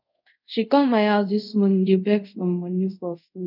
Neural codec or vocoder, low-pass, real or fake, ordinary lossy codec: codec, 24 kHz, 0.5 kbps, DualCodec; 5.4 kHz; fake; none